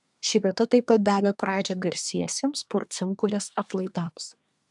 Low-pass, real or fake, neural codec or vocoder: 10.8 kHz; fake; codec, 24 kHz, 1 kbps, SNAC